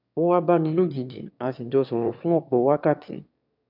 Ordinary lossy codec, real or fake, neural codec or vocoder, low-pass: none; fake; autoencoder, 22.05 kHz, a latent of 192 numbers a frame, VITS, trained on one speaker; 5.4 kHz